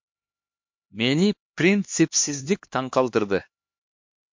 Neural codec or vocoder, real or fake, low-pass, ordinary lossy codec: codec, 16 kHz, 2 kbps, X-Codec, HuBERT features, trained on LibriSpeech; fake; 7.2 kHz; MP3, 48 kbps